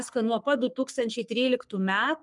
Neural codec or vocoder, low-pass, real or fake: codec, 44.1 kHz, 3.4 kbps, Pupu-Codec; 10.8 kHz; fake